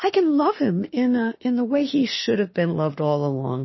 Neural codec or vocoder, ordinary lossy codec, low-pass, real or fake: autoencoder, 48 kHz, 32 numbers a frame, DAC-VAE, trained on Japanese speech; MP3, 24 kbps; 7.2 kHz; fake